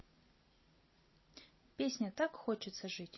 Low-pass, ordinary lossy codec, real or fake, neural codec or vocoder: 7.2 kHz; MP3, 24 kbps; real; none